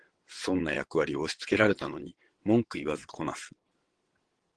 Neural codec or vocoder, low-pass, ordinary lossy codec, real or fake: vocoder, 22.05 kHz, 80 mel bands, WaveNeXt; 9.9 kHz; Opus, 16 kbps; fake